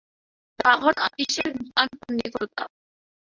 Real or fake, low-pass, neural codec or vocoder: fake; 7.2 kHz; codec, 24 kHz, 0.9 kbps, WavTokenizer, medium speech release version 1